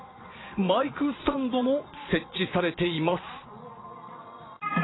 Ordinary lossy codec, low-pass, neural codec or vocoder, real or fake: AAC, 16 kbps; 7.2 kHz; vocoder, 22.05 kHz, 80 mel bands, Vocos; fake